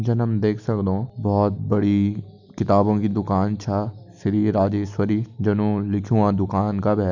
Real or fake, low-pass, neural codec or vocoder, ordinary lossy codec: real; 7.2 kHz; none; none